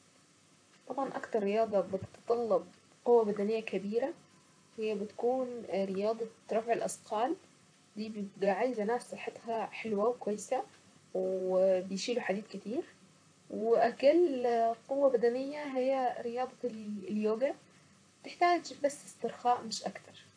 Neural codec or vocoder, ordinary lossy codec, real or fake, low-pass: vocoder, 44.1 kHz, 128 mel bands, Pupu-Vocoder; AAC, 64 kbps; fake; 9.9 kHz